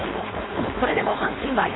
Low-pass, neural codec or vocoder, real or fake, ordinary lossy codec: 7.2 kHz; codec, 16 kHz, 4.8 kbps, FACodec; fake; AAC, 16 kbps